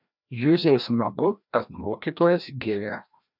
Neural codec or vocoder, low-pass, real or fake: codec, 16 kHz, 1 kbps, FreqCodec, larger model; 5.4 kHz; fake